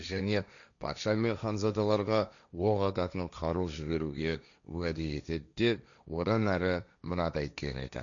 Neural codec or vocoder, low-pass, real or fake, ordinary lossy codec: codec, 16 kHz, 1.1 kbps, Voila-Tokenizer; 7.2 kHz; fake; none